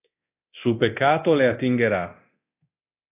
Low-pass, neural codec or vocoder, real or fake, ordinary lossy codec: 3.6 kHz; codec, 24 kHz, 0.9 kbps, DualCodec; fake; AAC, 32 kbps